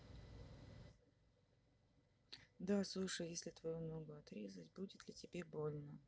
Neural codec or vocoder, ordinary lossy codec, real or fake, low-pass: none; none; real; none